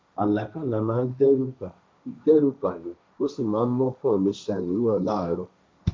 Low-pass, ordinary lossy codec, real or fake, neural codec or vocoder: none; none; fake; codec, 16 kHz, 1.1 kbps, Voila-Tokenizer